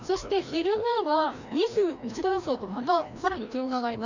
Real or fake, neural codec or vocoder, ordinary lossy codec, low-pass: fake; codec, 16 kHz, 1 kbps, FreqCodec, larger model; none; 7.2 kHz